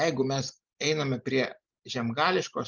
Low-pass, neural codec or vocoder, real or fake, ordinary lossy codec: 7.2 kHz; none; real; Opus, 24 kbps